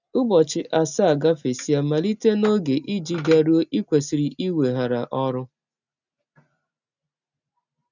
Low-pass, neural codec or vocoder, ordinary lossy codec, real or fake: 7.2 kHz; none; none; real